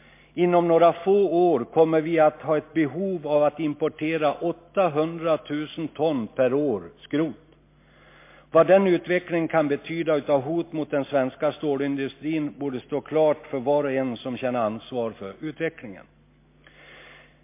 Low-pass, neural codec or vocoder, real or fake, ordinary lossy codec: 3.6 kHz; none; real; MP3, 24 kbps